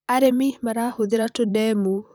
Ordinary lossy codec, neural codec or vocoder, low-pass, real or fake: none; vocoder, 44.1 kHz, 128 mel bands, Pupu-Vocoder; none; fake